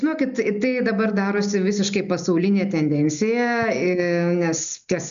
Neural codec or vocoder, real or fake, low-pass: none; real; 7.2 kHz